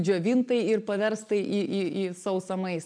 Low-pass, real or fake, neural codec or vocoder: 9.9 kHz; real; none